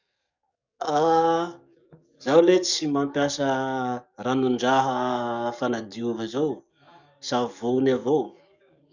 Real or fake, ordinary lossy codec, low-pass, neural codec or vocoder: fake; none; 7.2 kHz; codec, 44.1 kHz, 7.8 kbps, DAC